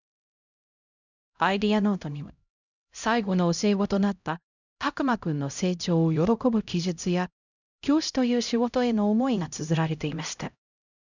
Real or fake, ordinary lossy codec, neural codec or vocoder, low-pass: fake; none; codec, 16 kHz, 0.5 kbps, X-Codec, HuBERT features, trained on LibriSpeech; 7.2 kHz